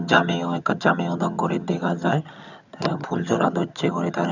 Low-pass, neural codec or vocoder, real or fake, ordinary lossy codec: 7.2 kHz; vocoder, 22.05 kHz, 80 mel bands, HiFi-GAN; fake; none